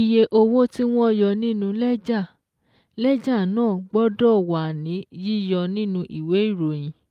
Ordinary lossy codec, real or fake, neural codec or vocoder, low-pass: Opus, 32 kbps; real; none; 14.4 kHz